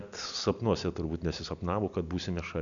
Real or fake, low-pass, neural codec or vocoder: real; 7.2 kHz; none